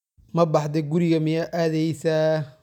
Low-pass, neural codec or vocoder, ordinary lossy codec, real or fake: 19.8 kHz; none; none; real